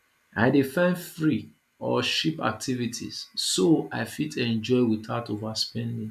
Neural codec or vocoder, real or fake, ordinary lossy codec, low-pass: none; real; AAC, 96 kbps; 14.4 kHz